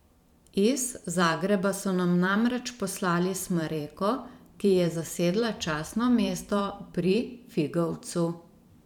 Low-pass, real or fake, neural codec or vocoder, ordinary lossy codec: 19.8 kHz; fake; vocoder, 44.1 kHz, 128 mel bands every 512 samples, BigVGAN v2; none